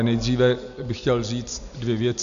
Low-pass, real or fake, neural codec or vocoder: 7.2 kHz; real; none